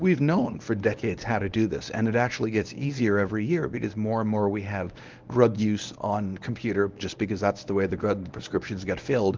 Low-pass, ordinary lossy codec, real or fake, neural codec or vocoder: 7.2 kHz; Opus, 32 kbps; fake; codec, 24 kHz, 0.9 kbps, WavTokenizer, medium speech release version 1